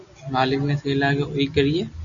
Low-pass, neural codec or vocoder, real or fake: 7.2 kHz; none; real